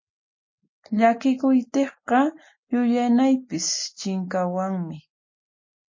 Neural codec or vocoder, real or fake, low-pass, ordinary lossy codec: none; real; 7.2 kHz; MP3, 32 kbps